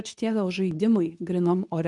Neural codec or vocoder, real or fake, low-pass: codec, 24 kHz, 0.9 kbps, WavTokenizer, medium speech release version 2; fake; 10.8 kHz